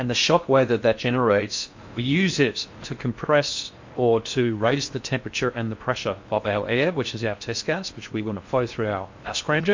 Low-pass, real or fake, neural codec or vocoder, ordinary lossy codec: 7.2 kHz; fake; codec, 16 kHz in and 24 kHz out, 0.6 kbps, FocalCodec, streaming, 4096 codes; MP3, 48 kbps